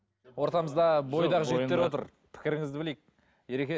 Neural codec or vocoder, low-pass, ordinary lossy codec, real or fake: none; none; none; real